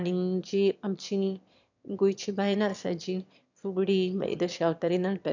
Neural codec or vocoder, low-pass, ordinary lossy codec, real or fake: autoencoder, 22.05 kHz, a latent of 192 numbers a frame, VITS, trained on one speaker; 7.2 kHz; none; fake